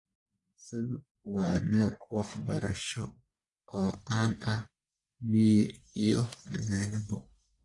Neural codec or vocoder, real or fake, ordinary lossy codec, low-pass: codec, 44.1 kHz, 1.7 kbps, Pupu-Codec; fake; AAC, 48 kbps; 10.8 kHz